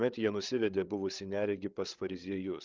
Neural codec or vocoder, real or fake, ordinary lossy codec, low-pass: codec, 16 kHz, 8 kbps, FreqCodec, larger model; fake; Opus, 32 kbps; 7.2 kHz